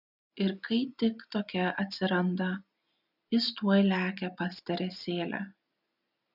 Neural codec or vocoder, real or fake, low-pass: none; real; 5.4 kHz